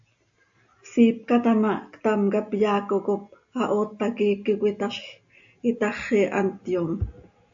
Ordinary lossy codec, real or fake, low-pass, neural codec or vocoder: AAC, 64 kbps; real; 7.2 kHz; none